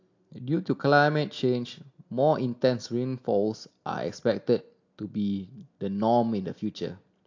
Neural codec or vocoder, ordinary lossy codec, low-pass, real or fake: none; AAC, 48 kbps; 7.2 kHz; real